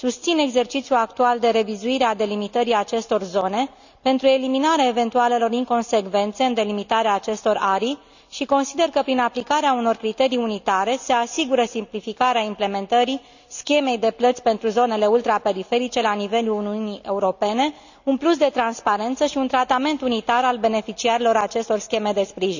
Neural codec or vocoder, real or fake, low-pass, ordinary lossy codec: none; real; 7.2 kHz; none